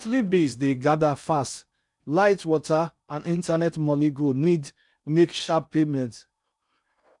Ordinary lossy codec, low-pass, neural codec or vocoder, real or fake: none; 10.8 kHz; codec, 16 kHz in and 24 kHz out, 0.6 kbps, FocalCodec, streaming, 4096 codes; fake